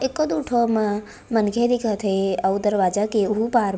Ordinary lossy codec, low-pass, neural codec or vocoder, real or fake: none; none; none; real